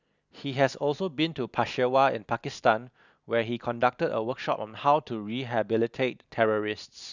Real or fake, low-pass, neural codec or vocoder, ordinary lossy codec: real; 7.2 kHz; none; none